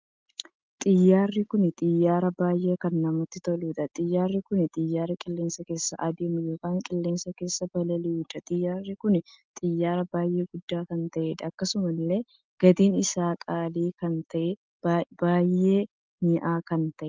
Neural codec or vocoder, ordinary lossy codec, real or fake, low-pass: none; Opus, 32 kbps; real; 7.2 kHz